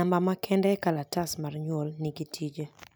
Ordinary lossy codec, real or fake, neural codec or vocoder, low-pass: none; real; none; none